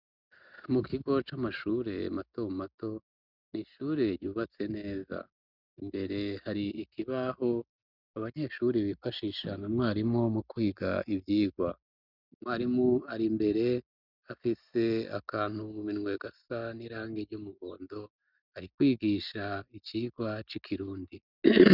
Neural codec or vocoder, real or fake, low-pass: none; real; 5.4 kHz